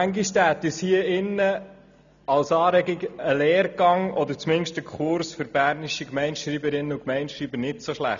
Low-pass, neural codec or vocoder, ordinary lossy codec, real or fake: 7.2 kHz; none; none; real